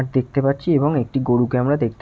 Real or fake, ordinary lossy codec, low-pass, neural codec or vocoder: real; none; none; none